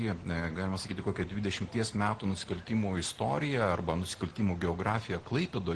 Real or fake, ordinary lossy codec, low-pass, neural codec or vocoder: real; Opus, 16 kbps; 10.8 kHz; none